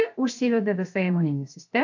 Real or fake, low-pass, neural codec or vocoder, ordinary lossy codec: fake; 7.2 kHz; codec, 16 kHz, 0.7 kbps, FocalCodec; AAC, 48 kbps